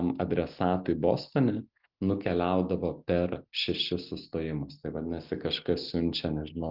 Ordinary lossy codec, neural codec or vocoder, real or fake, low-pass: Opus, 24 kbps; none; real; 5.4 kHz